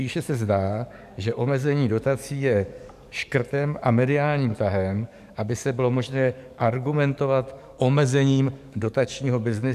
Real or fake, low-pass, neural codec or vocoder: fake; 14.4 kHz; codec, 44.1 kHz, 7.8 kbps, DAC